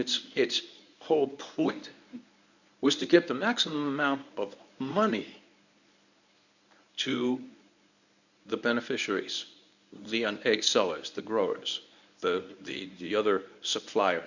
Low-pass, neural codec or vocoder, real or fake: 7.2 kHz; codec, 24 kHz, 0.9 kbps, WavTokenizer, medium speech release version 1; fake